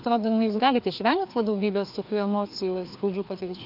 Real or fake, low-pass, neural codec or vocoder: fake; 5.4 kHz; codec, 16 kHz, 2 kbps, FreqCodec, larger model